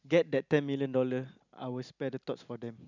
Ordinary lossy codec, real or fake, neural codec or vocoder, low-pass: none; real; none; 7.2 kHz